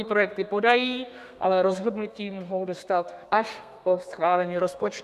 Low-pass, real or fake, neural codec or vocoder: 14.4 kHz; fake; codec, 32 kHz, 1.9 kbps, SNAC